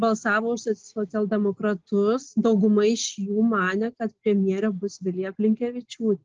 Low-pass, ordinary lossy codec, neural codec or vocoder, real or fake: 7.2 kHz; Opus, 16 kbps; none; real